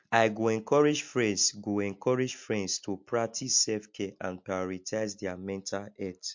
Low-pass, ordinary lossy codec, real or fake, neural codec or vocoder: 7.2 kHz; MP3, 48 kbps; real; none